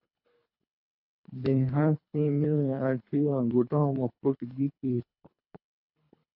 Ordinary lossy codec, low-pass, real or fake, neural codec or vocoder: AAC, 48 kbps; 5.4 kHz; fake; codec, 24 kHz, 3 kbps, HILCodec